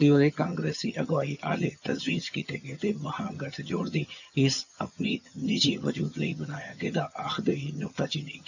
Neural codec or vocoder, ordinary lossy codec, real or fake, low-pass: vocoder, 22.05 kHz, 80 mel bands, HiFi-GAN; none; fake; 7.2 kHz